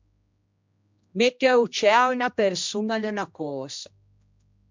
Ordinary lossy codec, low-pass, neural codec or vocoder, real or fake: MP3, 64 kbps; 7.2 kHz; codec, 16 kHz, 1 kbps, X-Codec, HuBERT features, trained on general audio; fake